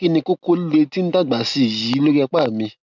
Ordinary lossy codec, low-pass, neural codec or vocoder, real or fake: none; 7.2 kHz; none; real